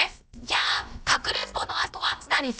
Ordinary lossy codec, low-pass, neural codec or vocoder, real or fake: none; none; codec, 16 kHz, about 1 kbps, DyCAST, with the encoder's durations; fake